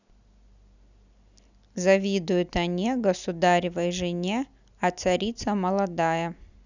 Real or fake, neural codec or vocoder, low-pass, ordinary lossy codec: real; none; 7.2 kHz; none